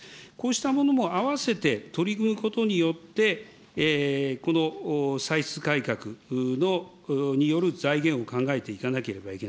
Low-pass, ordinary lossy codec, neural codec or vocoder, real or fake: none; none; none; real